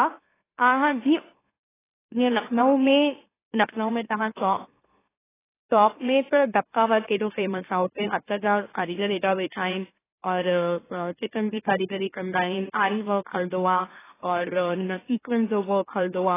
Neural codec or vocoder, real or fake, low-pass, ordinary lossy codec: autoencoder, 44.1 kHz, a latent of 192 numbers a frame, MeloTTS; fake; 3.6 kHz; AAC, 16 kbps